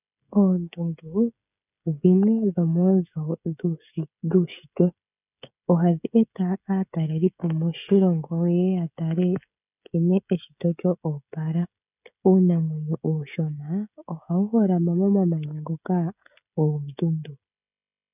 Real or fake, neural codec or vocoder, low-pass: fake; codec, 16 kHz, 16 kbps, FreqCodec, smaller model; 3.6 kHz